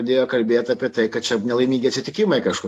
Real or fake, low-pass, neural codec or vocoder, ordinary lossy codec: real; 14.4 kHz; none; AAC, 64 kbps